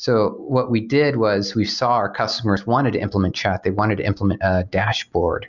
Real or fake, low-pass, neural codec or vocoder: real; 7.2 kHz; none